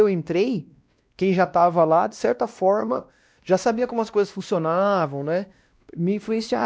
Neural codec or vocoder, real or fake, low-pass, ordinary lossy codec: codec, 16 kHz, 1 kbps, X-Codec, WavLM features, trained on Multilingual LibriSpeech; fake; none; none